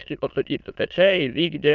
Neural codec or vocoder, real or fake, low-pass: autoencoder, 22.05 kHz, a latent of 192 numbers a frame, VITS, trained on many speakers; fake; 7.2 kHz